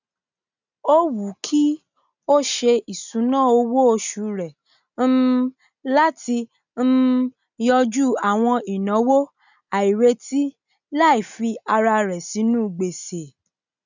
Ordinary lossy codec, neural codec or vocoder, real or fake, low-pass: none; none; real; 7.2 kHz